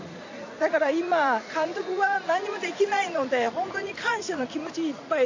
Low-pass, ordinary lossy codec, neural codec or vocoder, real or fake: 7.2 kHz; AAC, 48 kbps; vocoder, 44.1 kHz, 80 mel bands, Vocos; fake